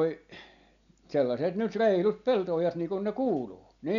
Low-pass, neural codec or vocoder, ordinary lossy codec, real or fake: 7.2 kHz; none; none; real